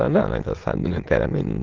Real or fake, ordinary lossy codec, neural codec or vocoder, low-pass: fake; Opus, 16 kbps; autoencoder, 22.05 kHz, a latent of 192 numbers a frame, VITS, trained on many speakers; 7.2 kHz